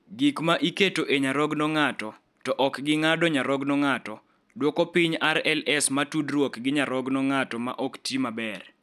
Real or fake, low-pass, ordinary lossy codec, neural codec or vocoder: real; 14.4 kHz; none; none